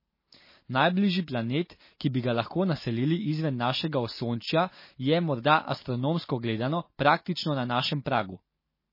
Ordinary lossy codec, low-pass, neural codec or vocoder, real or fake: MP3, 24 kbps; 5.4 kHz; none; real